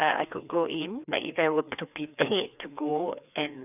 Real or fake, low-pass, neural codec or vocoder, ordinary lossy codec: fake; 3.6 kHz; codec, 16 kHz, 2 kbps, FreqCodec, larger model; none